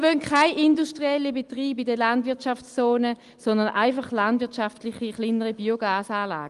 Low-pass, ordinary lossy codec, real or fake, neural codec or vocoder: 10.8 kHz; Opus, 24 kbps; real; none